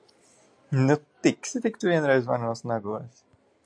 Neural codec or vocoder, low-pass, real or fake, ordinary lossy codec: none; 9.9 kHz; real; AAC, 64 kbps